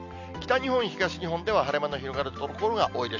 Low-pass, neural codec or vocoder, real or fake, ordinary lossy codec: 7.2 kHz; none; real; none